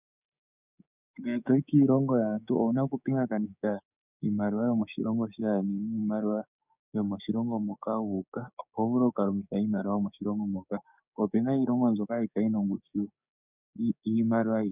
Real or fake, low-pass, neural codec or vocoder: fake; 3.6 kHz; codec, 16 kHz, 6 kbps, DAC